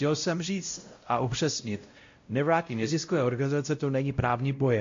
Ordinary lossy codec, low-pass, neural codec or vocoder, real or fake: MP3, 48 kbps; 7.2 kHz; codec, 16 kHz, 0.5 kbps, X-Codec, WavLM features, trained on Multilingual LibriSpeech; fake